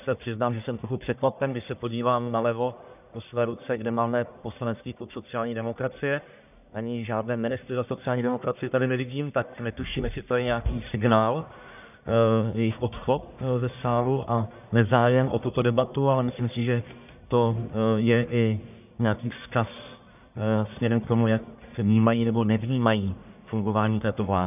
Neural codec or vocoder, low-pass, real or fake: codec, 44.1 kHz, 1.7 kbps, Pupu-Codec; 3.6 kHz; fake